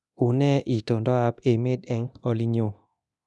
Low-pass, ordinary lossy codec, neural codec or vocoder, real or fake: none; none; codec, 24 kHz, 0.9 kbps, DualCodec; fake